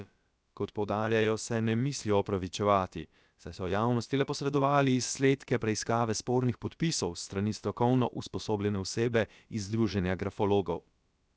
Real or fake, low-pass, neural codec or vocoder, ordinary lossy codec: fake; none; codec, 16 kHz, about 1 kbps, DyCAST, with the encoder's durations; none